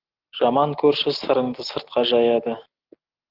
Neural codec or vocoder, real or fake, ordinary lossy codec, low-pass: none; real; Opus, 16 kbps; 5.4 kHz